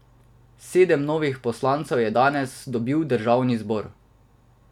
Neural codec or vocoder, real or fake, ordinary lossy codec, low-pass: none; real; none; 19.8 kHz